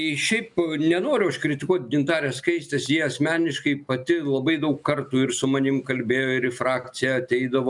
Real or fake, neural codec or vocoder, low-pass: real; none; 10.8 kHz